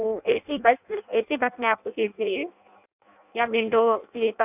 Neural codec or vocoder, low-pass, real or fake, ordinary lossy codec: codec, 16 kHz in and 24 kHz out, 0.6 kbps, FireRedTTS-2 codec; 3.6 kHz; fake; none